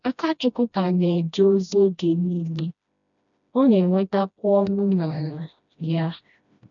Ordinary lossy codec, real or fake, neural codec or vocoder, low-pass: none; fake; codec, 16 kHz, 1 kbps, FreqCodec, smaller model; 7.2 kHz